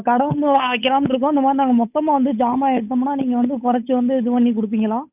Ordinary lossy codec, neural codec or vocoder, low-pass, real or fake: none; codec, 16 kHz, 8 kbps, FunCodec, trained on Chinese and English, 25 frames a second; 3.6 kHz; fake